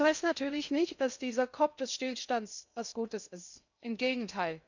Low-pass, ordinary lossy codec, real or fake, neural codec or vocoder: 7.2 kHz; none; fake; codec, 16 kHz in and 24 kHz out, 0.6 kbps, FocalCodec, streaming, 2048 codes